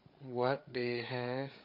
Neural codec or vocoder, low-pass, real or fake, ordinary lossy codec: codec, 16 kHz, 8 kbps, FreqCodec, larger model; 5.4 kHz; fake; AAC, 48 kbps